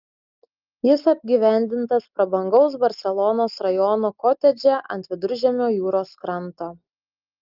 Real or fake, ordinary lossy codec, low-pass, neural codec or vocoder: real; Opus, 24 kbps; 5.4 kHz; none